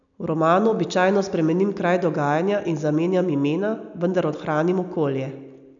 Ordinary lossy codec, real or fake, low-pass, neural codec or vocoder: none; real; 7.2 kHz; none